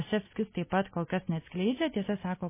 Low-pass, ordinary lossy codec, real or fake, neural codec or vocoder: 3.6 kHz; MP3, 16 kbps; real; none